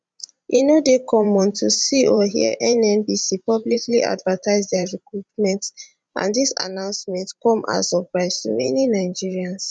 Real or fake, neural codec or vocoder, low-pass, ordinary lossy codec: fake; vocoder, 44.1 kHz, 128 mel bands every 512 samples, BigVGAN v2; 9.9 kHz; none